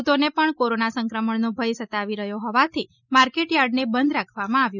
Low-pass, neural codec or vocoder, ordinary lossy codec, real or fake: 7.2 kHz; none; none; real